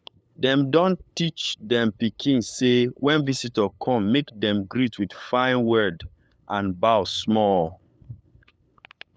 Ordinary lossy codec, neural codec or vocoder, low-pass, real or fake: none; codec, 16 kHz, 8 kbps, FunCodec, trained on LibriTTS, 25 frames a second; none; fake